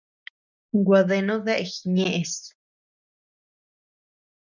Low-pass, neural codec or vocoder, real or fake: 7.2 kHz; none; real